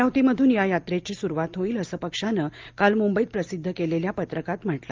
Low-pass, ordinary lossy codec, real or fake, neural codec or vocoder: 7.2 kHz; Opus, 32 kbps; real; none